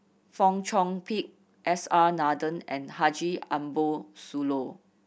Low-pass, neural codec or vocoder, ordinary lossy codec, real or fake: none; none; none; real